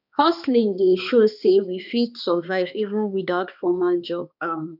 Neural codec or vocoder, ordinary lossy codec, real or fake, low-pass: codec, 16 kHz, 2 kbps, X-Codec, HuBERT features, trained on balanced general audio; none; fake; 5.4 kHz